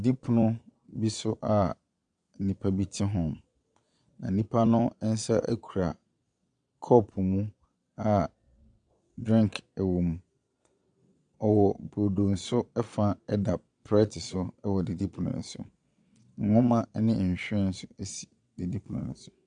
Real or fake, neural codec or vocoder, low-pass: fake; vocoder, 22.05 kHz, 80 mel bands, Vocos; 9.9 kHz